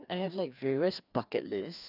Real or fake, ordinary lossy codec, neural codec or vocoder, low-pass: fake; none; codec, 16 kHz, 2 kbps, FreqCodec, larger model; 5.4 kHz